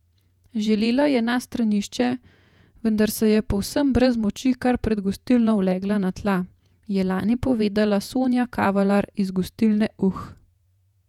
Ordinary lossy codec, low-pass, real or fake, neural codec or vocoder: none; 19.8 kHz; fake; vocoder, 48 kHz, 128 mel bands, Vocos